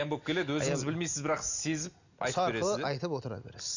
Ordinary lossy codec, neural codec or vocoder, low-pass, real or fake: none; none; 7.2 kHz; real